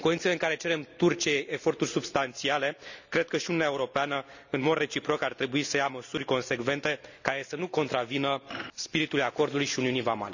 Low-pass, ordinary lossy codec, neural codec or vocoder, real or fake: 7.2 kHz; none; none; real